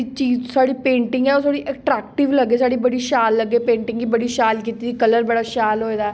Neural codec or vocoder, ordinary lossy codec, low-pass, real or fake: none; none; none; real